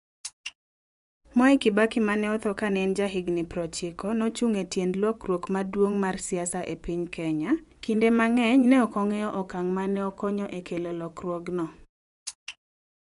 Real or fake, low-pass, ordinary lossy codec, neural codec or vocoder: fake; 10.8 kHz; none; vocoder, 24 kHz, 100 mel bands, Vocos